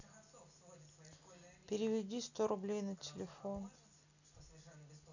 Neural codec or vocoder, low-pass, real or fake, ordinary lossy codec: none; 7.2 kHz; real; none